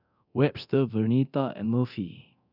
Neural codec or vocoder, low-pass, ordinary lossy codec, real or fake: codec, 24 kHz, 0.9 kbps, DualCodec; 5.4 kHz; none; fake